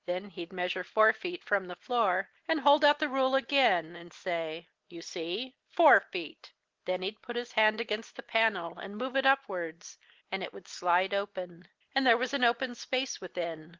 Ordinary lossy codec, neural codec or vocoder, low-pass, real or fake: Opus, 16 kbps; none; 7.2 kHz; real